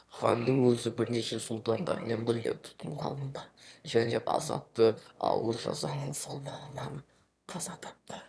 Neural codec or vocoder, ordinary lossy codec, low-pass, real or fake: autoencoder, 22.05 kHz, a latent of 192 numbers a frame, VITS, trained on one speaker; none; none; fake